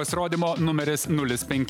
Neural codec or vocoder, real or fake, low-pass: none; real; 19.8 kHz